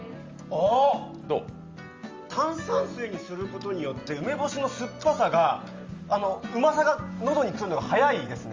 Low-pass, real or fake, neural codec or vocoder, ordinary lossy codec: 7.2 kHz; real; none; Opus, 32 kbps